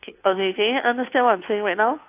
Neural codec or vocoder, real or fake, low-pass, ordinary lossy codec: codec, 16 kHz, 2 kbps, FunCodec, trained on Chinese and English, 25 frames a second; fake; 3.6 kHz; AAC, 32 kbps